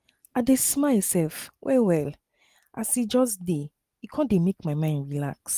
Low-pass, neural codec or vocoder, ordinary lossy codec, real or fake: 14.4 kHz; none; Opus, 24 kbps; real